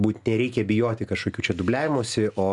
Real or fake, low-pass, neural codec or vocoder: real; 10.8 kHz; none